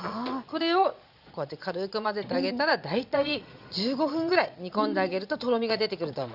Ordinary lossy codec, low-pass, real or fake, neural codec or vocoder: Opus, 64 kbps; 5.4 kHz; fake; vocoder, 22.05 kHz, 80 mel bands, Vocos